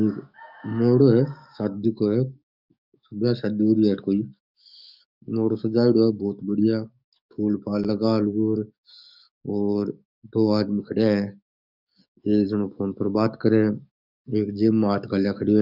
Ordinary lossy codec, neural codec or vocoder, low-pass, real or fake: none; codec, 44.1 kHz, 7.8 kbps, DAC; 5.4 kHz; fake